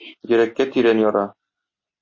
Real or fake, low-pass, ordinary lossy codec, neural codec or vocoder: real; 7.2 kHz; MP3, 32 kbps; none